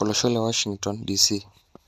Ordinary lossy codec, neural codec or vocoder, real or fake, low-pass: none; none; real; 14.4 kHz